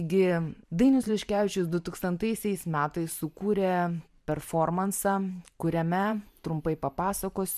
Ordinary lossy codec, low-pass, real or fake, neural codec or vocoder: MP3, 96 kbps; 14.4 kHz; fake; vocoder, 44.1 kHz, 128 mel bands every 512 samples, BigVGAN v2